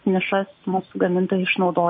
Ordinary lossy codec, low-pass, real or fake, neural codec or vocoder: MP3, 24 kbps; 7.2 kHz; real; none